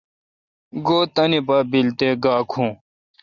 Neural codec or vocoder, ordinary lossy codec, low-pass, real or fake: none; Opus, 64 kbps; 7.2 kHz; real